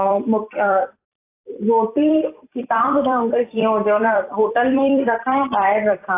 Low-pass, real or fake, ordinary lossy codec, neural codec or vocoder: 3.6 kHz; fake; AAC, 24 kbps; vocoder, 44.1 kHz, 128 mel bands every 256 samples, BigVGAN v2